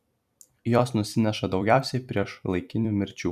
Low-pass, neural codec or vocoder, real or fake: 14.4 kHz; vocoder, 44.1 kHz, 128 mel bands every 256 samples, BigVGAN v2; fake